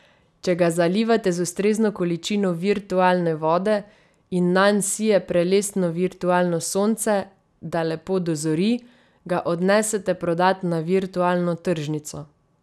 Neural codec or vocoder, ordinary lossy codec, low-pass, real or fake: none; none; none; real